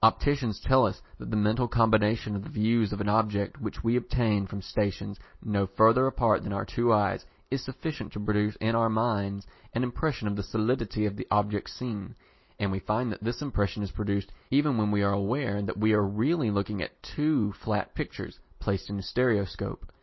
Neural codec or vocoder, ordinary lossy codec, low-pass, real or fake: none; MP3, 24 kbps; 7.2 kHz; real